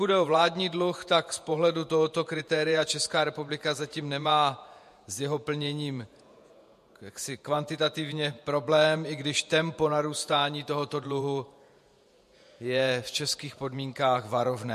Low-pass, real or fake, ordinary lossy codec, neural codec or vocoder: 14.4 kHz; fake; MP3, 64 kbps; vocoder, 48 kHz, 128 mel bands, Vocos